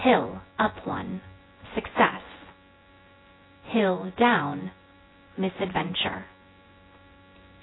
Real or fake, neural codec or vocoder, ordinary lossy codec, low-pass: fake; vocoder, 24 kHz, 100 mel bands, Vocos; AAC, 16 kbps; 7.2 kHz